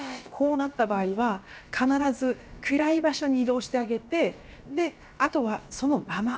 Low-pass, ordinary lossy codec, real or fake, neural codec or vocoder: none; none; fake; codec, 16 kHz, about 1 kbps, DyCAST, with the encoder's durations